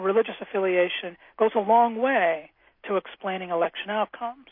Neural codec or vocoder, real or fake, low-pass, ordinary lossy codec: none; real; 5.4 kHz; MP3, 32 kbps